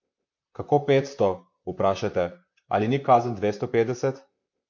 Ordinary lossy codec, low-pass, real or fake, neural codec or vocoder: MP3, 48 kbps; 7.2 kHz; fake; vocoder, 44.1 kHz, 128 mel bands, Pupu-Vocoder